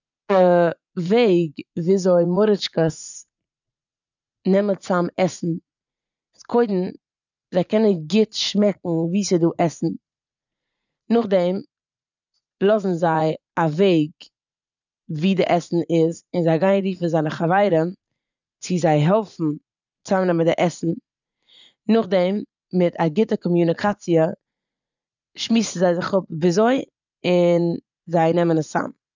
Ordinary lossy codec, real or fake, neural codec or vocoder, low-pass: none; fake; vocoder, 44.1 kHz, 80 mel bands, Vocos; 7.2 kHz